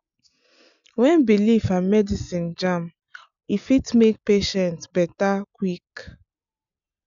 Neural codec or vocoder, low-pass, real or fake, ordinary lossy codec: none; 7.2 kHz; real; none